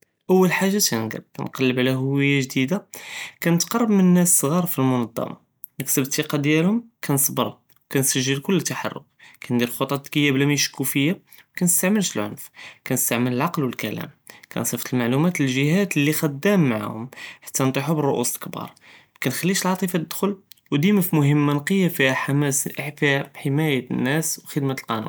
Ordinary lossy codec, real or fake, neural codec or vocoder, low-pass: none; real; none; none